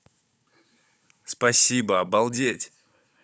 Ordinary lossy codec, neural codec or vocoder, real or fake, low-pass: none; codec, 16 kHz, 16 kbps, FunCodec, trained on Chinese and English, 50 frames a second; fake; none